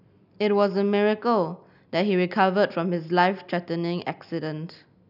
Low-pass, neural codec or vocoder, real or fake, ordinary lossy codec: 5.4 kHz; none; real; none